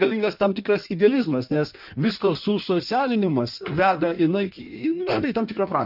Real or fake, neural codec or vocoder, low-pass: fake; codec, 16 kHz in and 24 kHz out, 1.1 kbps, FireRedTTS-2 codec; 5.4 kHz